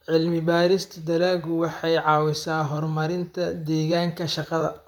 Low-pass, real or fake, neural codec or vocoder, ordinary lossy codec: 19.8 kHz; fake; vocoder, 44.1 kHz, 128 mel bands, Pupu-Vocoder; none